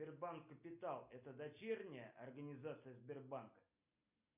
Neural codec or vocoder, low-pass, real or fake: none; 3.6 kHz; real